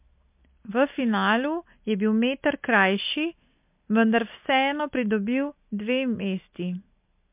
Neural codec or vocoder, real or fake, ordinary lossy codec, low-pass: none; real; MP3, 32 kbps; 3.6 kHz